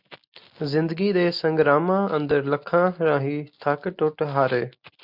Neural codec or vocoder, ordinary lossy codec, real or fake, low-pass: none; MP3, 48 kbps; real; 5.4 kHz